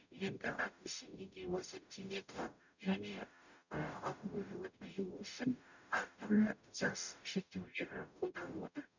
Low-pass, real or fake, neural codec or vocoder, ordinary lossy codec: 7.2 kHz; fake; codec, 44.1 kHz, 0.9 kbps, DAC; none